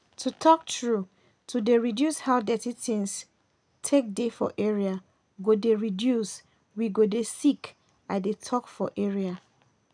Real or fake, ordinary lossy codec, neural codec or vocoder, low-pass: real; none; none; 9.9 kHz